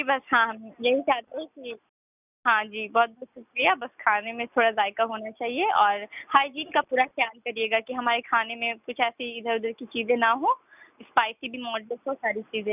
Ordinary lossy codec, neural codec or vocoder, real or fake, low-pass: none; none; real; 3.6 kHz